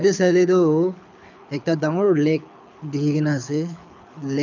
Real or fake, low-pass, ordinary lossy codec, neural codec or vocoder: fake; 7.2 kHz; none; codec, 24 kHz, 6 kbps, HILCodec